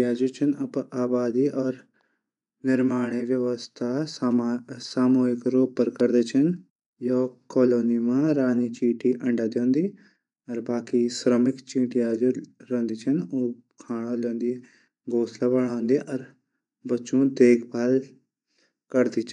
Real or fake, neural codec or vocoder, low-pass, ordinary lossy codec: fake; vocoder, 24 kHz, 100 mel bands, Vocos; 10.8 kHz; none